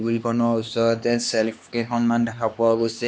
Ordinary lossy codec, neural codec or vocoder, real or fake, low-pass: none; codec, 16 kHz, 2 kbps, X-Codec, HuBERT features, trained on LibriSpeech; fake; none